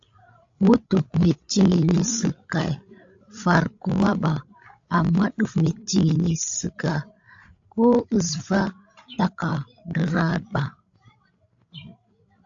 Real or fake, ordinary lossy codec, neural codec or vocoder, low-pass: fake; AAC, 64 kbps; codec, 16 kHz, 16 kbps, FreqCodec, larger model; 7.2 kHz